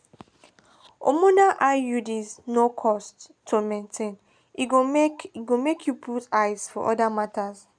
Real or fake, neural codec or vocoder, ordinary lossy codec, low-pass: real; none; none; 9.9 kHz